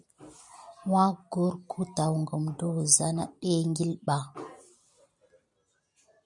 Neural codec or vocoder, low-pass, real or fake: none; 10.8 kHz; real